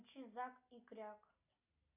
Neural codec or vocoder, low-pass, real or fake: none; 3.6 kHz; real